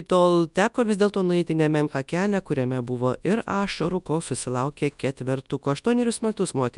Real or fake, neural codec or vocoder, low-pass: fake; codec, 24 kHz, 0.9 kbps, WavTokenizer, large speech release; 10.8 kHz